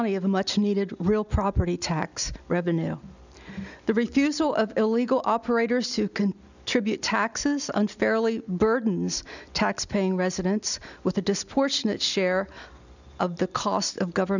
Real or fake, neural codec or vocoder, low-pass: real; none; 7.2 kHz